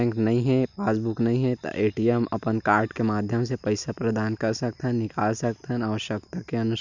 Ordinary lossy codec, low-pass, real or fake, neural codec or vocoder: none; 7.2 kHz; real; none